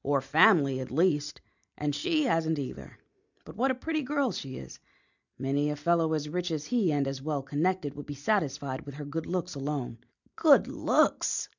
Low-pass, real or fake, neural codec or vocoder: 7.2 kHz; real; none